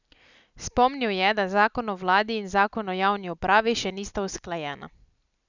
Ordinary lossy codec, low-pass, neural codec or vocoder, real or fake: none; 7.2 kHz; none; real